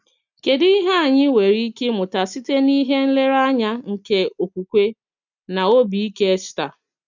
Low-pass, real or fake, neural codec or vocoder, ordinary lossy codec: 7.2 kHz; real; none; none